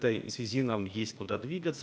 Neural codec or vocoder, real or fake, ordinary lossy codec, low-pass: codec, 16 kHz, 0.8 kbps, ZipCodec; fake; none; none